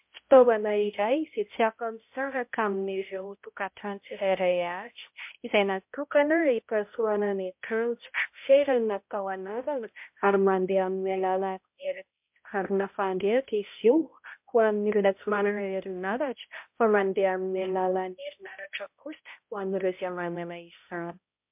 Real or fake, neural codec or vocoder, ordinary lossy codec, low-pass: fake; codec, 16 kHz, 0.5 kbps, X-Codec, HuBERT features, trained on balanced general audio; MP3, 32 kbps; 3.6 kHz